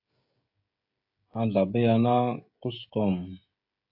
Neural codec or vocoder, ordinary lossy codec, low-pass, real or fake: codec, 16 kHz, 16 kbps, FreqCodec, smaller model; AAC, 48 kbps; 5.4 kHz; fake